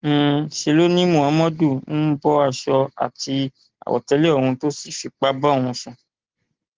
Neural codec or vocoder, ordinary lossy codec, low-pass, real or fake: none; Opus, 16 kbps; 7.2 kHz; real